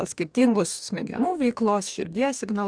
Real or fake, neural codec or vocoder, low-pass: fake; codec, 44.1 kHz, 2.6 kbps, DAC; 9.9 kHz